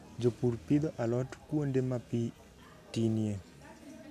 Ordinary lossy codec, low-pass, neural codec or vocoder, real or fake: none; 14.4 kHz; none; real